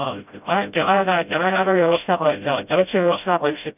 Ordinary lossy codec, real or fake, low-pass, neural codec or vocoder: none; fake; 3.6 kHz; codec, 16 kHz, 0.5 kbps, FreqCodec, smaller model